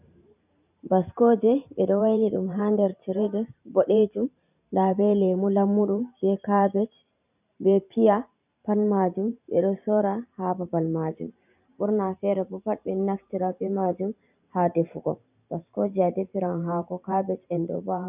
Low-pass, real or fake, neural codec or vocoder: 3.6 kHz; fake; vocoder, 44.1 kHz, 128 mel bands every 512 samples, BigVGAN v2